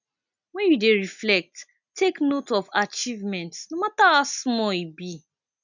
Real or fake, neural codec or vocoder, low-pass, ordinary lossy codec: real; none; 7.2 kHz; none